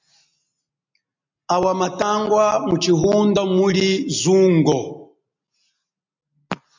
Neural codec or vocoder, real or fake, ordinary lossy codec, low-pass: none; real; MP3, 64 kbps; 7.2 kHz